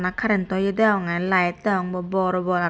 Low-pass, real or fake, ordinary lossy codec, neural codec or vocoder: none; real; none; none